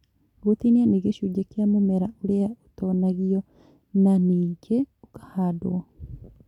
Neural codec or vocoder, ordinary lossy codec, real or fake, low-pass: none; none; real; 19.8 kHz